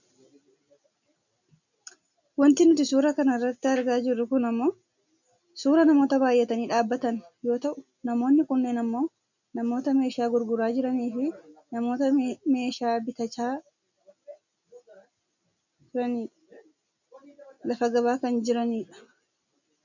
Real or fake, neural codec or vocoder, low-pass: real; none; 7.2 kHz